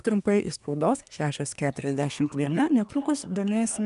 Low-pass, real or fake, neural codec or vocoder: 10.8 kHz; fake; codec, 24 kHz, 1 kbps, SNAC